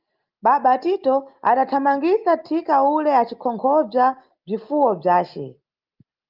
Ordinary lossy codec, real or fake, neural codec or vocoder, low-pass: Opus, 32 kbps; real; none; 5.4 kHz